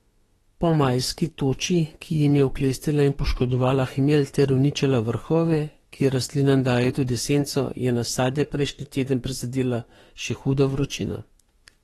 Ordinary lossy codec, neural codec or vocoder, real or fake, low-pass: AAC, 32 kbps; autoencoder, 48 kHz, 32 numbers a frame, DAC-VAE, trained on Japanese speech; fake; 19.8 kHz